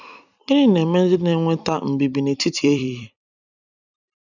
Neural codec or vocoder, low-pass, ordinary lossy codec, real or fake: vocoder, 44.1 kHz, 128 mel bands every 256 samples, BigVGAN v2; 7.2 kHz; none; fake